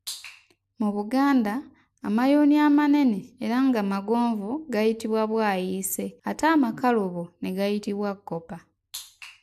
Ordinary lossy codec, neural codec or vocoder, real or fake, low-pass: AAC, 96 kbps; none; real; 14.4 kHz